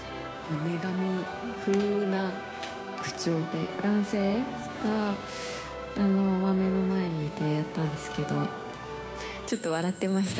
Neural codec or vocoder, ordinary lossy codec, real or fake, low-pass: codec, 16 kHz, 6 kbps, DAC; none; fake; none